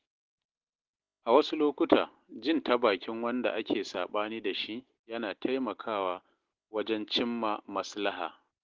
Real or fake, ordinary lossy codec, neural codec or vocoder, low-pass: real; Opus, 16 kbps; none; 7.2 kHz